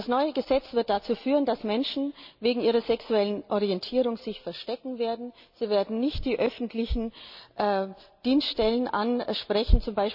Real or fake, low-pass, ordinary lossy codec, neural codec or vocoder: real; 5.4 kHz; none; none